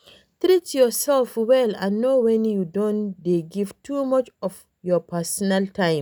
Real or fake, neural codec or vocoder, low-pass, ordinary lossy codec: real; none; none; none